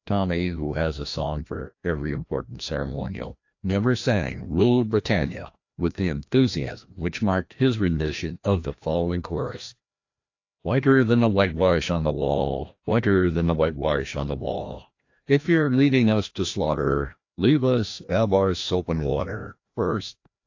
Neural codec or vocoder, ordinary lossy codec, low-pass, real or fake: codec, 16 kHz, 1 kbps, FreqCodec, larger model; AAC, 48 kbps; 7.2 kHz; fake